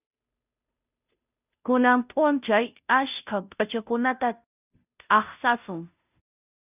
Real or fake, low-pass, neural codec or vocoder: fake; 3.6 kHz; codec, 16 kHz, 0.5 kbps, FunCodec, trained on Chinese and English, 25 frames a second